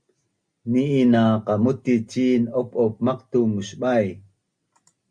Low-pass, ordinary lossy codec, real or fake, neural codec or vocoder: 9.9 kHz; Opus, 64 kbps; real; none